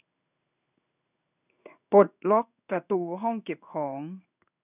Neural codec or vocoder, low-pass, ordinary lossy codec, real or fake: codec, 16 kHz in and 24 kHz out, 1 kbps, XY-Tokenizer; 3.6 kHz; none; fake